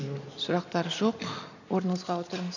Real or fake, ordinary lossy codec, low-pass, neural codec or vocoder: real; none; 7.2 kHz; none